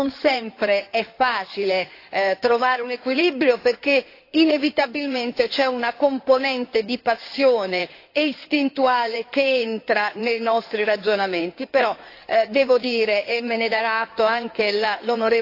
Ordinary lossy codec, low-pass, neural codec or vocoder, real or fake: AAC, 32 kbps; 5.4 kHz; codec, 16 kHz in and 24 kHz out, 2.2 kbps, FireRedTTS-2 codec; fake